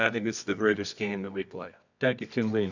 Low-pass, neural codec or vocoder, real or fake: 7.2 kHz; codec, 24 kHz, 0.9 kbps, WavTokenizer, medium music audio release; fake